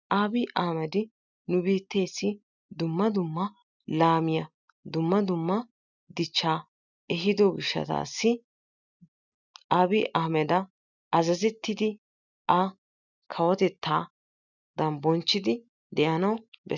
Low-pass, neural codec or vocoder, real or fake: 7.2 kHz; none; real